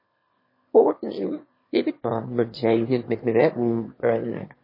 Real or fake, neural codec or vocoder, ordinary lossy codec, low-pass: fake; autoencoder, 22.05 kHz, a latent of 192 numbers a frame, VITS, trained on one speaker; MP3, 24 kbps; 5.4 kHz